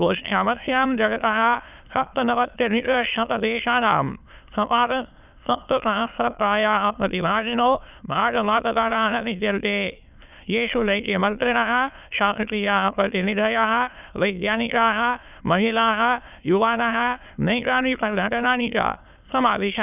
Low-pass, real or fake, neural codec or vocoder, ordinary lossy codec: 3.6 kHz; fake; autoencoder, 22.05 kHz, a latent of 192 numbers a frame, VITS, trained on many speakers; none